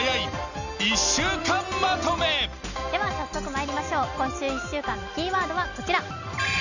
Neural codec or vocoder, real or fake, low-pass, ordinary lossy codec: none; real; 7.2 kHz; none